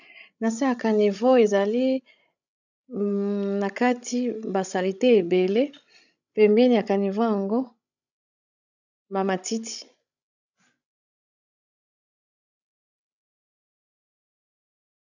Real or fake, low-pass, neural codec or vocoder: fake; 7.2 kHz; codec, 16 kHz, 16 kbps, FreqCodec, larger model